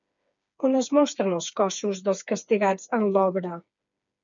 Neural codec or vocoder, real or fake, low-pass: codec, 16 kHz, 4 kbps, FreqCodec, smaller model; fake; 7.2 kHz